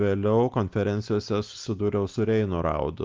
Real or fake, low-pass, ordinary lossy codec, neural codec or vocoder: real; 7.2 kHz; Opus, 32 kbps; none